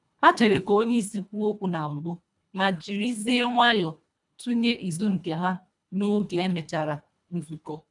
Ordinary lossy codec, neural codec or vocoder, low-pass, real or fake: none; codec, 24 kHz, 1.5 kbps, HILCodec; 10.8 kHz; fake